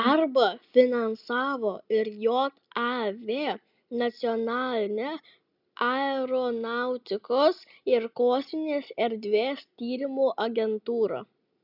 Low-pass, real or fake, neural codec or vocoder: 5.4 kHz; real; none